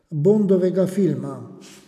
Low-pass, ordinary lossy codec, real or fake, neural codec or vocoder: 14.4 kHz; none; real; none